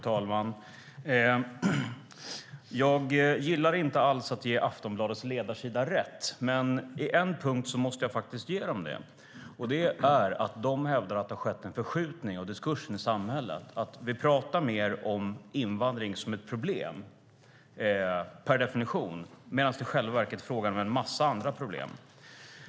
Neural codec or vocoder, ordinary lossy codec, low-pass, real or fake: none; none; none; real